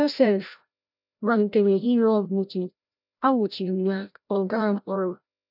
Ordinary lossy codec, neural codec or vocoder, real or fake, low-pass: none; codec, 16 kHz, 0.5 kbps, FreqCodec, larger model; fake; 5.4 kHz